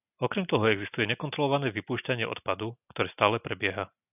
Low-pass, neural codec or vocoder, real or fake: 3.6 kHz; none; real